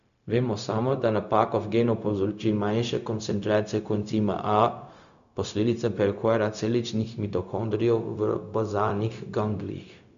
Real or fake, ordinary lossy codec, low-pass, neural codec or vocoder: fake; none; 7.2 kHz; codec, 16 kHz, 0.4 kbps, LongCat-Audio-Codec